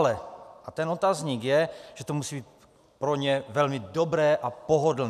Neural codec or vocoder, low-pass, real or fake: none; 14.4 kHz; real